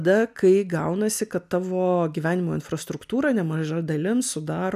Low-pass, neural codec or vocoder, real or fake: 14.4 kHz; none; real